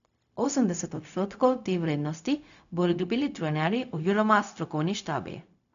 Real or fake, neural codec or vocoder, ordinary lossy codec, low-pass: fake; codec, 16 kHz, 0.4 kbps, LongCat-Audio-Codec; MP3, 96 kbps; 7.2 kHz